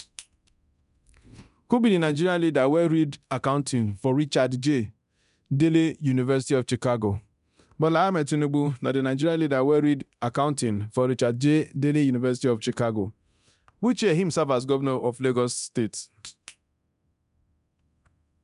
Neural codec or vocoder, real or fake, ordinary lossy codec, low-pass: codec, 24 kHz, 0.9 kbps, DualCodec; fake; none; 10.8 kHz